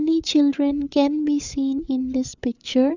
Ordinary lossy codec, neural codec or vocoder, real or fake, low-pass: Opus, 64 kbps; codec, 16 kHz, 8 kbps, FreqCodec, larger model; fake; 7.2 kHz